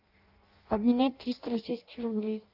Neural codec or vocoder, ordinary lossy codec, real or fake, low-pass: codec, 16 kHz in and 24 kHz out, 0.6 kbps, FireRedTTS-2 codec; Opus, 24 kbps; fake; 5.4 kHz